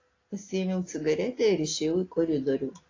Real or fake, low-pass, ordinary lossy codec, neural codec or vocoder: real; 7.2 kHz; AAC, 32 kbps; none